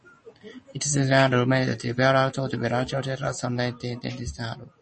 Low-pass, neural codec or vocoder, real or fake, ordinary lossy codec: 10.8 kHz; none; real; MP3, 32 kbps